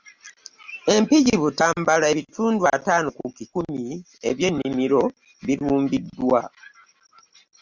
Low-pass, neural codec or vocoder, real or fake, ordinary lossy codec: 7.2 kHz; none; real; Opus, 64 kbps